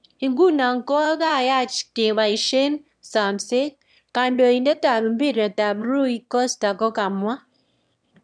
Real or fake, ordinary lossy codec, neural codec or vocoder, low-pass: fake; none; autoencoder, 22.05 kHz, a latent of 192 numbers a frame, VITS, trained on one speaker; 9.9 kHz